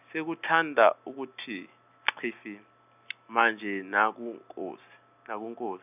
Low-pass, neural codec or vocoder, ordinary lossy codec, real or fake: 3.6 kHz; none; none; real